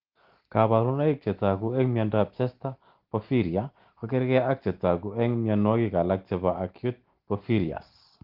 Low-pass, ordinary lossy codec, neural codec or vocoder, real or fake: 5.4 kHz; Opus, 16 kbps; none; real